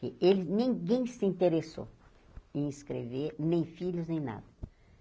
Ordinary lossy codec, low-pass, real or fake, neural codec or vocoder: none; none; real; none